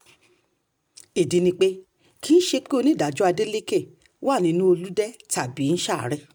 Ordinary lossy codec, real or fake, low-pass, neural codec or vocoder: none; real; none; none